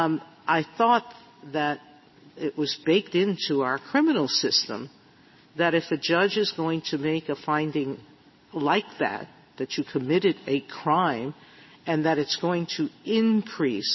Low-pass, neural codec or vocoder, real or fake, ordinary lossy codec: 7.2 kHz; none; real; MP3, 24 kbps